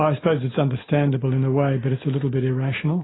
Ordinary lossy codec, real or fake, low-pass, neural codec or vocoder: AAC, 16 kbps; real; 7.2 kHz; none